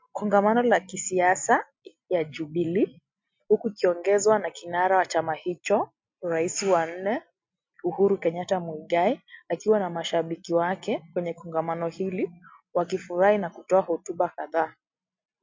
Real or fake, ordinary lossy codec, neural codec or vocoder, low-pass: real; MP3, 48 kbps; none; 7.2 kHz